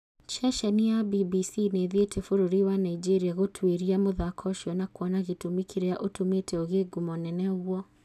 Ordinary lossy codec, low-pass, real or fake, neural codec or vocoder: none; 14.4 kHz; real; none